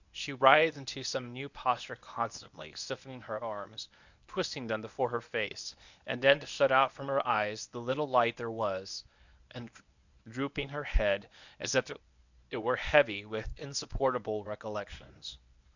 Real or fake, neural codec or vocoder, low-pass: fake; codec, 24 kHz, 0.9 kbps, WavTokenizer, medium speech release version 1; 7.2 kHz